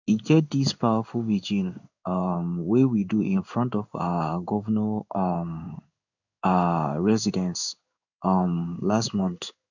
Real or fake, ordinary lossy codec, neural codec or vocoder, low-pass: fake; none; codec, 16 kHz in and 24 kHz out, 1 kbps, XY-Tokenizer; 7.2 kHz